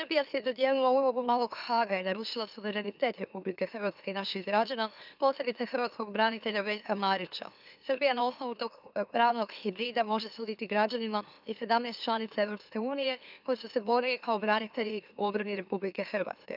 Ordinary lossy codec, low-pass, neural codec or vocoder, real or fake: none; 5.4 kHz; autoencoder, 44.1 kHz, a latent of 192 numbers a frame, MeloTTS; fake